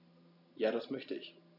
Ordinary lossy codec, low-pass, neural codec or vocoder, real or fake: none; 5.4 kHz; none; real